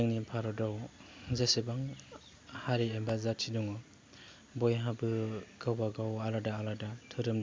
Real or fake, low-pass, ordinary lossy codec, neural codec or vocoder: real; none; none; none